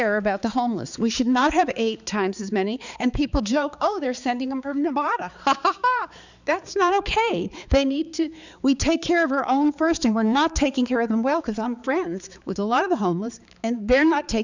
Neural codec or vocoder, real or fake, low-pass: codec, 16 kHz, 4 kbps, X-Codec, HuBERT features, trained on balanced general audio; fake; 7.2 kHz